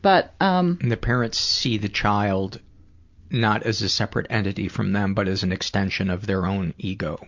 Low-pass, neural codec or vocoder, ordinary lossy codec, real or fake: 7.2 kHz; none; MP3, 64 kbps; real